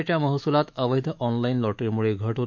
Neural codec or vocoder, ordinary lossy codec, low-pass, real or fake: autoencoder, 48 kHz, 128 numbers a frame, DAC-VAE, trained on Japanese speech; MP3, 64 kbps; 7.2 kHz; fake